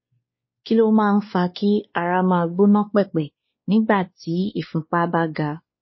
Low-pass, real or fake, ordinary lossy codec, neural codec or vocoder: 7.2 kHz; fake; MP3, 24 kbps; codec, 16 kHz, 2 kbps, X-Codec, WavLM features, trained on Multilingual LibriSpeech